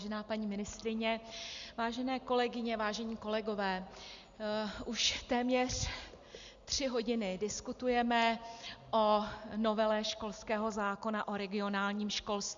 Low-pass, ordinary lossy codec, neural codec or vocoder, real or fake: 7.2 kHz; Opus, 64 kbps; none; real